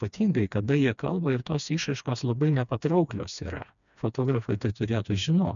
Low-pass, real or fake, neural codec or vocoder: 7.2 kHz; fake; codec, 16 kHz, 2 kbps, FreqCodec, smaller model